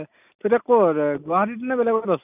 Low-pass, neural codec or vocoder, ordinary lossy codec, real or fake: 3.6 kHz; none; none; real